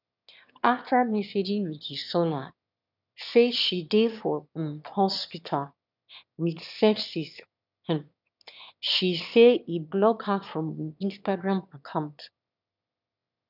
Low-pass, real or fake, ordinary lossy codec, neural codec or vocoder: 5.4 kHz; fake; none; autoencoder, 22.05 kHz, a latent of 192 numbers a frame, VITS, trained on one speaker